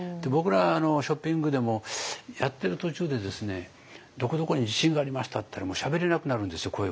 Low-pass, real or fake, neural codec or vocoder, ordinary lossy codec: none; real; none; none